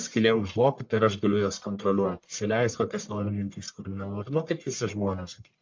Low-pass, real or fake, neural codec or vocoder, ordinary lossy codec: 7.2 kHz; fake; codec, 44.1 kHz, 1.7 kbps, Pupu-Codec; MP3, 64 kbps